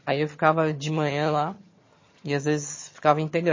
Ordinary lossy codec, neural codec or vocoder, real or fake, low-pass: MP3, 32 kbps; vocoder, 22.05 kHz, 80 mel bands, HiFi-GAN; fake; 7.2 kHz